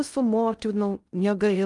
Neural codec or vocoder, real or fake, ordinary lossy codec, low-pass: codec, 16 kHz in and 24 kHz out, 0.6 kbps, FocalCodec, streaming, 2048 codes; fake; Opus, 32 kbps; 10.8 kHz